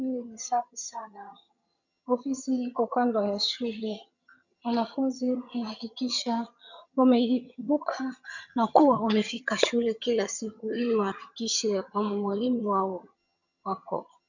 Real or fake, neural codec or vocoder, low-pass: fake; vocoder, 22.05 kHz, 80 mel bands, HiFi-GAN; 7.2 kHz